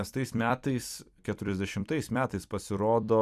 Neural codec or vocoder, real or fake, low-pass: vocoder, 44.1 kHz, 128 mel bands every 256 samples, BigVGAN v2; fake; 14.4 kHz